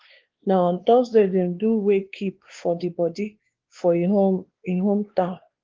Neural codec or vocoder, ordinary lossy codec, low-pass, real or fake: codec, 16 kHz, 2 kbps, X-Codec, WavLM features, trained on Multilingual LibriSpeech; Opus, 16 kbps; 7.2 kHz; fake